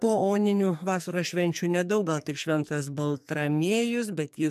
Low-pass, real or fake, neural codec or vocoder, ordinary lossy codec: 14.4 kHz; fake; codec, 44.1 kHz, 2.6 kbps, SNAC; MP3, 96 kbps